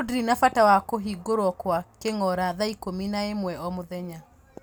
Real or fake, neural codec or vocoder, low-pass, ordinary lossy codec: real; none; none; none